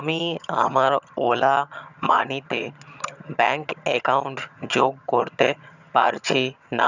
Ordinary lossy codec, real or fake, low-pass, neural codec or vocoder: none; fake; 7.2 kHz; vocoder, 22.05 kHz, 80 mel bands, HiFi-GAN